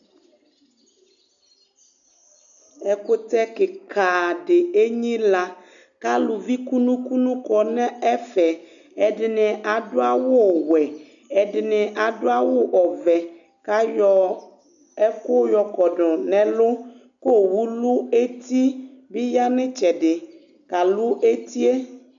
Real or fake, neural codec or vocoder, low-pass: real; none; 7.2 kHz